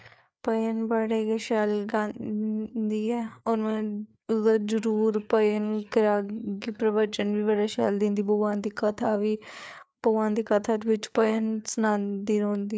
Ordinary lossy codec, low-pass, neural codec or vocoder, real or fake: none; none; codec, 16 kHz, 4 kbps, FreqCodec, larger model; fake